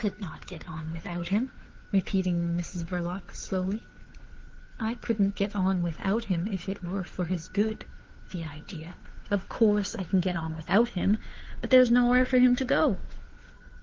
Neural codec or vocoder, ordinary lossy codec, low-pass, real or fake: codec, 16 kHz, 2 kbps, FunCodec, trained on Chinese and English, 25 frames a second; Opus, 16 kbps; 7.2 kHz; fake